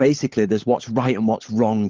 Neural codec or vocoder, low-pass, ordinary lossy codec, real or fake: codec, 16 kHz, 16 kbps, FunCodec, trained on LibriTTS, 50 frames a second; 7.2 kHz; Opus, 16 kbps; fake